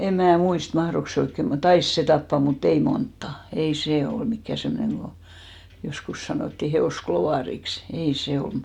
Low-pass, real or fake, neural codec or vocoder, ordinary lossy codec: 19.8 kHz; real; none; none